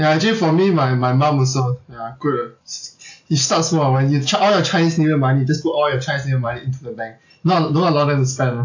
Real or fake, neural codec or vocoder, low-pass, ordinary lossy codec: real; none; 7.2 kHz; AAC, 48 kbps